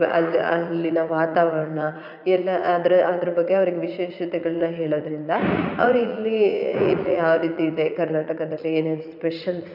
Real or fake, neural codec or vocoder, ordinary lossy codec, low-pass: fake; vocoder, 44.1 kHz, 80 mel bands, Vocos; none; 5.4 kHz